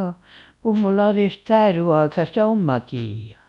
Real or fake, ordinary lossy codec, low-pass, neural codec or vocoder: fake; none; 10.8 kHz; codec, 24 kHz, 0.9 kbps, WavTokenizer, large speech release